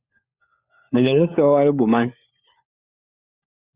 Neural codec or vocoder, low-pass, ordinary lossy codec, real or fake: codec, 16 kHz, 4 kbps, FunCodec, trained on LibriTTS, 50 frames a second; 3.6 kHz; Opus, 64 kbps; fake